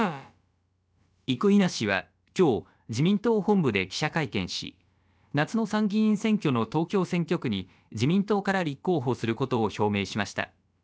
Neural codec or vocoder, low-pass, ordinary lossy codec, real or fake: codec, 16 kHz, about 1 kbps, DyCAST, with the encoder's durations; none; none; fake